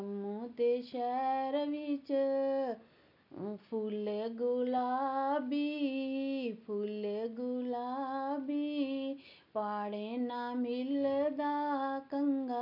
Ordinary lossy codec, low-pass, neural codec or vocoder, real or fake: none; 5.4 kHz; none; real